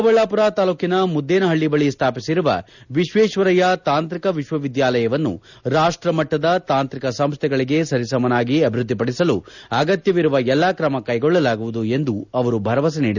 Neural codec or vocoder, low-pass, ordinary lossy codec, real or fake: none; 7.2 kHz; none; real